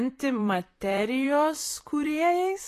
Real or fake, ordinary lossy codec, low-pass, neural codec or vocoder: fake; AAC, 48 kbps; 14.4 kHz; vocoder, 44.1 kHz, 128 mel bands, Pupu-Vocoder